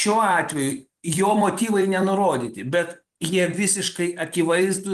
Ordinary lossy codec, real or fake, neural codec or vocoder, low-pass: Opus, 24 kbps; fake; vocoder, 44.1 kHz, 128 mel bands every 256 samples, BigVGAN v2; 14.4 kHz